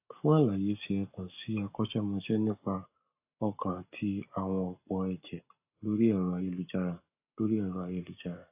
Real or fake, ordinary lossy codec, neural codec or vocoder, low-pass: fake; AAC, 32 kbps; codec, 44.1 kHz, 7.8 kbps, Pupu-Codec; 3.6 kHz